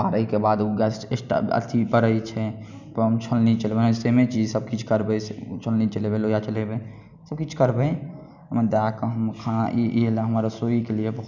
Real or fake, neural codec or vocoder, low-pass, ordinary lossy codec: real; none; 7.2 kHz; none